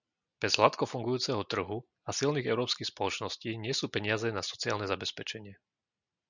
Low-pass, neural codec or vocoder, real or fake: 7.2 kHz; none; real